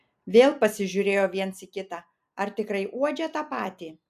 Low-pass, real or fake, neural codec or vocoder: 14.4 kHz; real; none